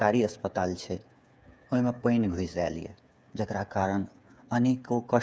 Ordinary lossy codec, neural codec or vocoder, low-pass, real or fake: none; codec, 16 kHz, 8 kbps, FreqCodec, smaller model; none; fake